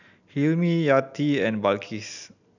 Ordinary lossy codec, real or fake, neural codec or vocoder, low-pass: none; real; none; 7.2 kHz